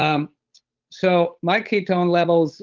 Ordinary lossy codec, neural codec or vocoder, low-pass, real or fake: Opus, 32 kbps; codec, 16 kHz, 8 kbps, FunCodec, trained on Chinese and English, 25 frames a second; 7.2 kHz; fake